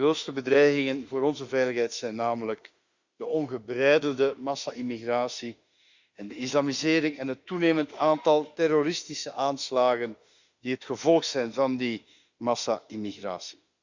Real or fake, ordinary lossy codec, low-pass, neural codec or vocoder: fake; Opus, 64 kbps; 7.2 kHz; autoencoder, 48 kHz, 32 numbers a frame, DAC-VAE, trained on Japanese speech